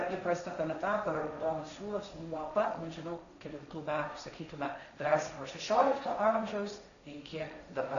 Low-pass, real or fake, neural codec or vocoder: 7.2 kHz; fake; codec, 16 kHz, 1.1 kbps, Voila-Tokenizer